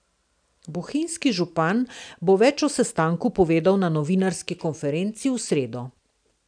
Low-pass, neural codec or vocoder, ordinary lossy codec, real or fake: 9.9 kHz; none; none; real